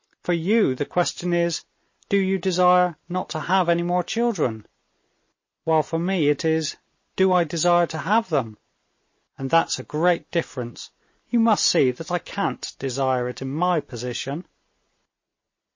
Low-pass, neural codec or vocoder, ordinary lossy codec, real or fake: 7.2 kHz; none; MP3, 32 kbps; real